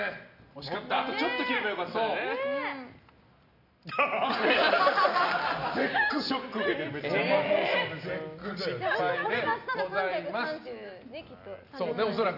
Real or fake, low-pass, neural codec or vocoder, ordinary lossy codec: real; 5.4 kHz; none; none